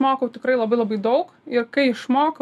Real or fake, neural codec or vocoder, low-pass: real; none; 14.4 kHz